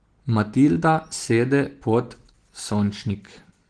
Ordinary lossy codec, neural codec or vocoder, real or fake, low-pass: Opus, 16 kbps; none; real; 9.9 kHz